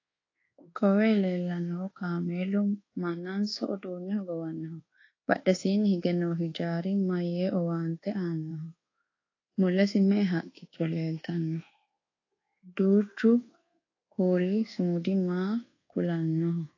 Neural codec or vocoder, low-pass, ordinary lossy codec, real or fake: codec, 24 kHz, 1.2 kbps, DualCodec; 7.2 kHz; AAC, 32 kbps; fake